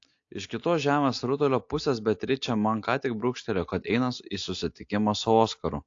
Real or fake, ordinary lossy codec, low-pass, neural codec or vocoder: real; AAC, 48 kbps; 7.2 kHz; none